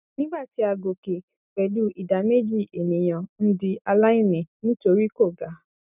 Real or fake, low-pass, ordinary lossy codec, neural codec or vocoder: real; 3.6 kHz; none; none